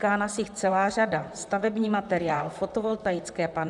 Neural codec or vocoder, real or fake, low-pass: vocoder, 44.1 kHz, 128 mel bands, Pupu-Vocoder; fake; 10.8 kHz